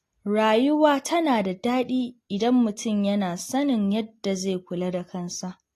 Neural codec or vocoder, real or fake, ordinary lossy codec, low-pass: none; real; AAC, 48 kbps; 14.4 kHz